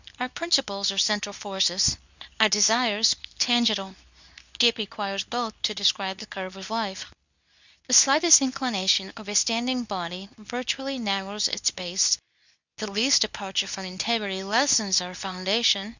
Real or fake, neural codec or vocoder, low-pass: fake; codec, 24 kHz, 0.9 kbps, WavTokenizer, medium speech release version 2; 7.2 kHz